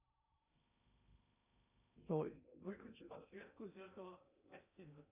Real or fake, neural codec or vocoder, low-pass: fake; codec, 16 kHz in and 24 kHz out, 0.6 kbps, FocalCodec, streaming, 4096 codes; 3.6 kHz